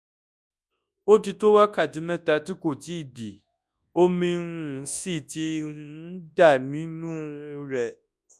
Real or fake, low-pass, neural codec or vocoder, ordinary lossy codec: fake; none; codec, 24 kHz, 0.9 kbps, WavTokenizer, large speech release; none